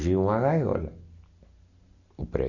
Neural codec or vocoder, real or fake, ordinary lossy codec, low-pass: none; real; none; 7.2 kHz